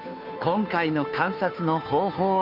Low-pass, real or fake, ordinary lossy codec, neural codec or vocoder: 5.4 kHz; fake; none; codec, 16 kHz, 2 kbps, FunCodec, trained on Chinese and English, 25 frames a second